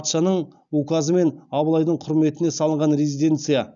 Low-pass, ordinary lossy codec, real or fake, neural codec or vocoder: 7.2 kHz; none; real; none